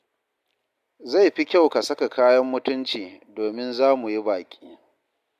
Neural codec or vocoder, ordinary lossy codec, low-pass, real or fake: none; none; 14.4 kHz; real